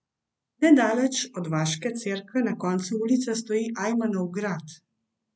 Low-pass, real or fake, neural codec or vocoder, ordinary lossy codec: none; real; none; none